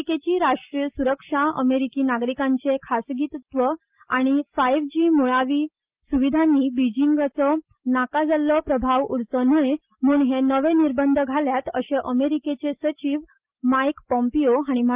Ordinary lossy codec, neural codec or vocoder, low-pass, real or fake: Opus, 32 kbps; none; 3.6 kHz; real